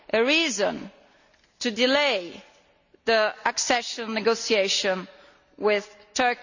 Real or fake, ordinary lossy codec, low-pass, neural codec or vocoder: real; none; 7.2 kHz; none